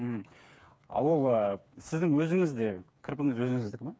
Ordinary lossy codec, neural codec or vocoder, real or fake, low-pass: none; codec, 16 kHz, 4 kbps, FreqCodec, smaller model; fake; none